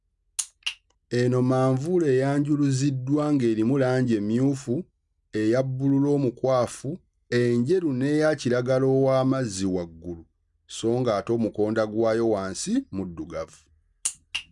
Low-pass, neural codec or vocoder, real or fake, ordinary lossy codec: 10.8 kHz; none; real; none